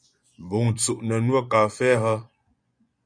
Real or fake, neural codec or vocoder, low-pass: fake; vocoder, 24 kHz, 100 mel bands, Vocos; 9.9 kHz